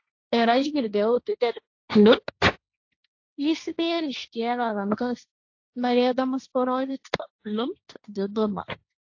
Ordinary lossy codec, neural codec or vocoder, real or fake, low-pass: AAC, 48 kbps; codec, 16 kHz, 1.1 kbps, Voila-Tokenizer; fake; 7.2 kHz